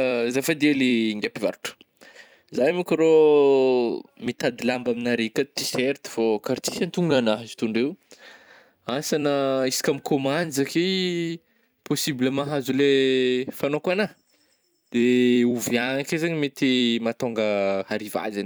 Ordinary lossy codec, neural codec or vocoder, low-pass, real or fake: none; vocoder, 44.1 kHz, 128 mel bands every 256 samples, BigVGAN v2; none; fake